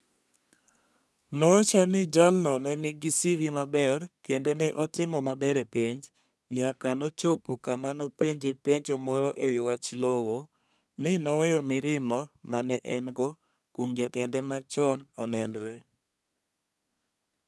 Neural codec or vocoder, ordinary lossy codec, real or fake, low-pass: codec, 24 kHz, 1 kbps, SNAC; none; fake; none